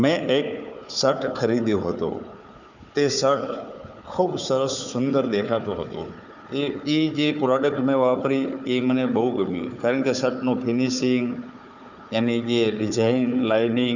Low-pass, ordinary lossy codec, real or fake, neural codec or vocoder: 7.2 kHz; none; fake; codec, 16 kHz, 4 kbps, FunCodec, trained on Chinese and English, 50 frames a second